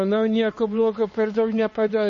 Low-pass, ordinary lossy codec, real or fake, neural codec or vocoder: 7.2 kHz; MP3, 32 kbps; fake; codec, 16 kHz, 2 kbps, X-Codec, HuBERT features, trained on LibriSpeech